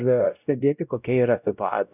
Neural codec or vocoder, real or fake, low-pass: codec, 16 kHz, 0.5 kbps, X-Codec, WavLM features, trained on Multilingual LibriSpeech; fake; 3.6 kHz